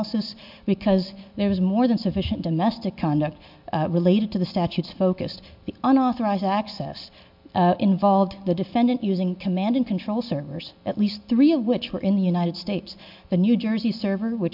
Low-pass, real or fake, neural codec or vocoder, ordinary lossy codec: 5.4 kHz; real; none; MP3, 48 kbps